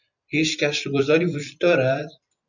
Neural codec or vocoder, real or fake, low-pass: vocoder, 44.1 kHz, 128 mel bands every 256 samples, BigVGAN v2; fake; 7.2 kHz